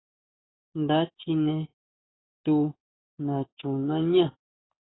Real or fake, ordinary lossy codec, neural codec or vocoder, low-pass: fake; AAC, 16 kbps; codec, 44.1 kHz, 7.8 kbps, DAC; 7.2 kHz